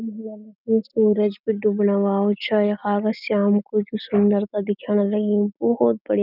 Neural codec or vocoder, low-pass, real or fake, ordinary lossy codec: none; 5.4 kHz; real; none